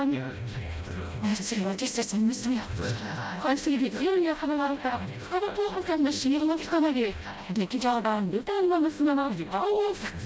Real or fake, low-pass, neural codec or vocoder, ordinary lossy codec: fake; none; codec, 16 kHz, 0.5 kbps, FreqCodec, smaller model; none